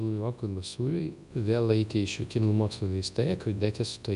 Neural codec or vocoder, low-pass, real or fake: codec, 24 kHz, 0.9 kbps, WavTokenizer, large speech release; 10.8 kHz; fake